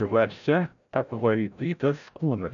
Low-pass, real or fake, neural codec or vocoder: 7.2 kHz; fake; codec, 16 kHz, 0.5 kbps, FreqCodec, larger model